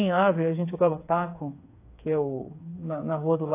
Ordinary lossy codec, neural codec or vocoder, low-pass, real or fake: AAC, 24 kbps; codec, 16 kHz, 4 kbps, FreqCodec, smaller model; 3.6 kHz; fake